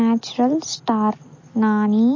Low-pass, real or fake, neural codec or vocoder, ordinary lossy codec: 7.2 kHz; real; none; MP3, 32 kbps